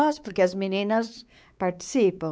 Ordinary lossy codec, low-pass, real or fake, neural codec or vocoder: none; none; fake; codec, 16 kHz, 4 kbps, X-Codec, WavLM features, trained on Multilingual LibriSpeech